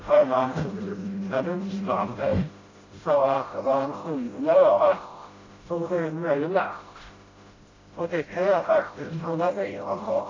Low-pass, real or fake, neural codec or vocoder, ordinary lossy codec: 7.2 kHz; fake; codec, 16 kHz, 0.5 kbps, FreqCodec, smaller model; AAC, 32 kbps